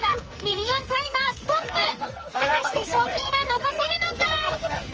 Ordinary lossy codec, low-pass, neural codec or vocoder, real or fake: Opus, 24 kbps; 7.2 kHz; codec, 44.1 kHz, 2.6 kbps, SNAC; fake